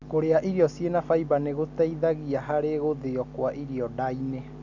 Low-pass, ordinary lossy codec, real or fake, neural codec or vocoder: 7.2 kHz; none; real; none